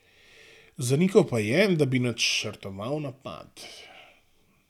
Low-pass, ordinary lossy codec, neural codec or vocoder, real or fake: 19.8 kHz; none; none; real